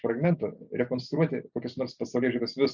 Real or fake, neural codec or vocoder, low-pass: real; none; 7.2 kHz